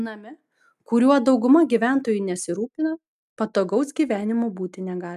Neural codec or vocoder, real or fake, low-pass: none; real; 14.4 kHz